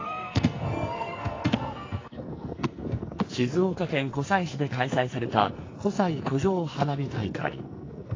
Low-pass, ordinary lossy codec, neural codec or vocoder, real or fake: 7.2 kHz; AAC, 32 kbps; codec, 44.1 kHz, 2.6 kbps, SNAC; fake